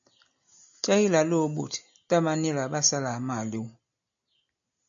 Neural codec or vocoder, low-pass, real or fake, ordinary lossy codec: none; 7.2 kHz; real; AAC, 64 kbps